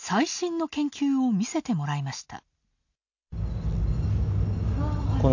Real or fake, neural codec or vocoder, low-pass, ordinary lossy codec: real; none; 7.2 kHz; none